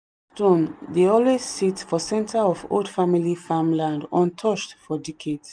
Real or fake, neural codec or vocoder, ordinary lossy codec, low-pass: real; none; none; 9.9 kHz